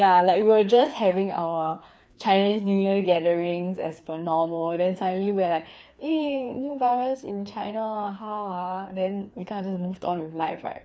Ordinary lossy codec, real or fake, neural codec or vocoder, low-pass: none; fake; codec, 16 kHz, 2 kbps, FreqCodec, larger model; none